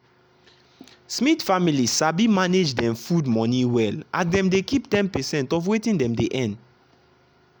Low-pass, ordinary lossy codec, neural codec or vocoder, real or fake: none; none; none; real